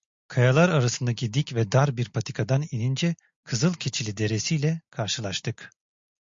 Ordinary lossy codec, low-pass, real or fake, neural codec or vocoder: MP3, 48 kbps; 7.2 kHz; real; none